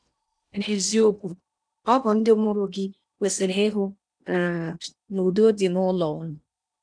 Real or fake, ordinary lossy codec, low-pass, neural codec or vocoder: fake; none; 9.9 kHz; codec, 16 kHz in and 24 kHz out, 0.8 kbps, FocalCodec, streaming, 65536 codes